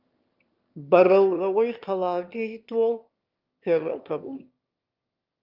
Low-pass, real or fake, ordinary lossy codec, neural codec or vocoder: 5.4 kHz; fake; Opus, 24 kbps; autoencoder, 22.05 kHz, a latent of 192 numbers a frame, VITS, trained on one speaker